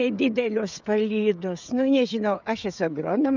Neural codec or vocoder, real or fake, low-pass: codec, 16 kHz, 16 kbps, FreqCodec, smaller model; fake; 7.2 kHz